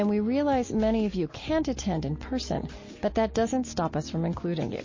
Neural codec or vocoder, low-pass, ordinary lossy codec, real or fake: none; 7.2 kHz; MP3, 32 kbps; real